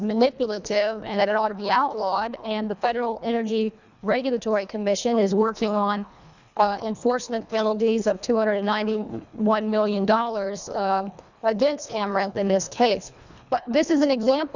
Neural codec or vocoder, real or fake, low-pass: codec, 24 kHz, 1.5 kbps, HILCodec; fake; 7.2 kHz